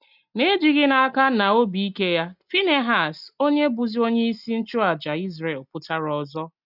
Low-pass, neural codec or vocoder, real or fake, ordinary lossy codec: 5.4 kHz; none; real; none